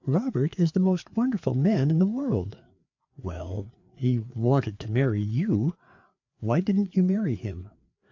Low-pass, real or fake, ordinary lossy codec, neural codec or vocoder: 7.2 kHz; fake; AAC, 48 kbps; codec, 16 kHz, 4 kbps, FreqCodec, larger model